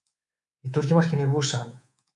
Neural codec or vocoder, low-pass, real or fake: codec, 24 kHz, 3.1 kbps, DualCodec; 10.8 kHz; fake